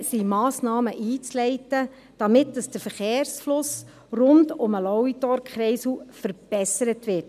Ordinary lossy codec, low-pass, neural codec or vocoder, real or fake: none; 14.4 kHz; none; real